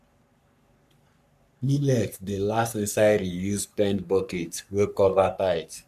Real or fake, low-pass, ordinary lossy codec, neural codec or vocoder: fake; 14.4 kHz; none; codec, 44.1 kHz, 3.4 kbps, Pupu-Codec